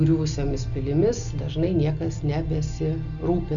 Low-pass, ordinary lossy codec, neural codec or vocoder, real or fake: 7.2 kHz; MP3, 96 kbps; none; real